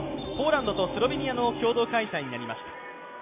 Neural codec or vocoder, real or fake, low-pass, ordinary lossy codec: none; real; 3.6 kHz; AAC, 24 kbps